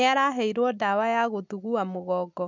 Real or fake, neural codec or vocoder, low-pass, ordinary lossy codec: real; none; 7.2 kHz; none